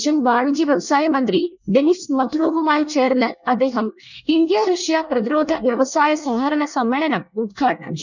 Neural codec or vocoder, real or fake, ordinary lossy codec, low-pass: codec, 24 kHz, 1 kbps, SNAC; fake; none; 7.2 kHz